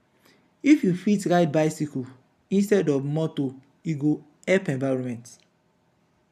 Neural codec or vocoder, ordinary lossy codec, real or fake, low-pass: none; none; real; 14.4 kHz